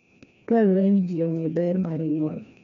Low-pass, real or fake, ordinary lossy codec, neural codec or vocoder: 7.2 kHz; fake; none; codec, 16 kHz, 1 kbps, FreqCodec, larger model